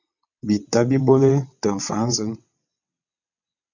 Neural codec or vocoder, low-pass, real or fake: vocoder, 22.05 kHz, 80 mel bands, WaveNeXt; 7.2 kHz; fake